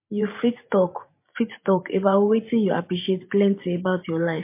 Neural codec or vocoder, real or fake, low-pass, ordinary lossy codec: vocoder, 44.1 kHz, 128 mel bands every 512 samples, BigVGAN v2; fake; 3.6 kHz; MP3, 24 kbps